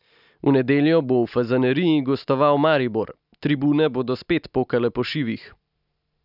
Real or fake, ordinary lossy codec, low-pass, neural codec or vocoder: real; none; 5.4 kHz; none